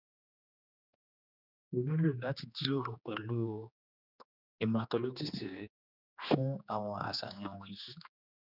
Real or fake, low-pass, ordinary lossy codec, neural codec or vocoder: fake; 5.4 kHz; none; codec, 16 kHz, 2 kbps, X-Codec, HuBERT features, trained on general audio